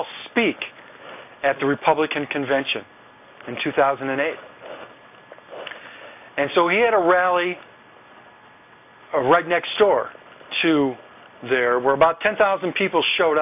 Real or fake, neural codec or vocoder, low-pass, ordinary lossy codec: real; none; 3.6 kHz; AAC, 32 kbps